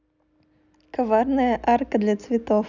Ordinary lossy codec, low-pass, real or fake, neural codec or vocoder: none; 7.2 kHz; real; none